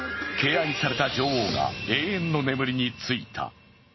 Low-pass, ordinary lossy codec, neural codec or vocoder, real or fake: 7.2 kHz; MP3, 24 kbps; none; real